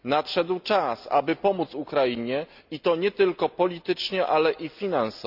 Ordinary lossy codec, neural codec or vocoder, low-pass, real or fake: none; none; 5.4 kHz; real